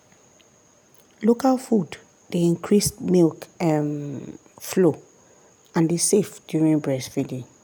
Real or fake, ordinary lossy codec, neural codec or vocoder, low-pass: real; none; none; none